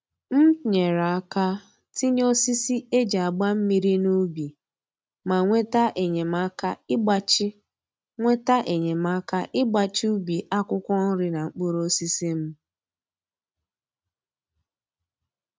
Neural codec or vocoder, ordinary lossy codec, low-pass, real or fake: none; none; none; real